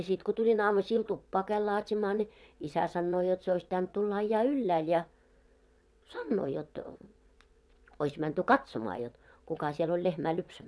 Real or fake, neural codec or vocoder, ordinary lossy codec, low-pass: fake; vocoder, 22.05 kHz, 80 mel bands, WaveNeXt; none; none